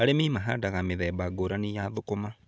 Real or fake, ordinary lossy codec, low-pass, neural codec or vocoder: real; none; none; none